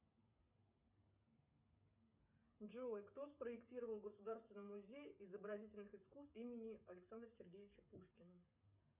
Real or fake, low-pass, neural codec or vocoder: fake; 3.6 kHz; codec, 16 kHz, 16 kbps, FreqCodec, smaller model